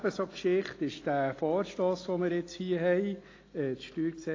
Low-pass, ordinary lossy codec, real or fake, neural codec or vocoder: 7.2 kHz; AAC, 32 kbps; real; none